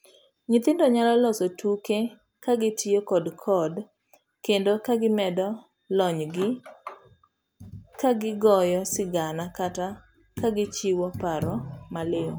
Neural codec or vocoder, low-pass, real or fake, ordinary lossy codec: none; none; real; none